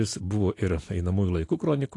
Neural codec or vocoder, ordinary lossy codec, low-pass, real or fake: none; AAC, 48 kbps; 10.8 kHz; real